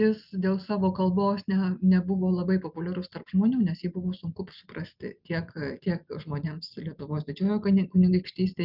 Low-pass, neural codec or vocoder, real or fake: 5.4 kHz; none; real